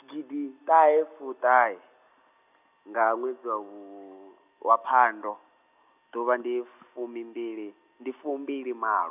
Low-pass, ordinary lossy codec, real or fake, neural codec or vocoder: 3.6 kHz; none; real; none